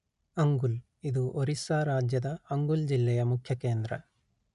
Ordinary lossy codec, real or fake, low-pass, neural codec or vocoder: none; real; 10.8 kHz; none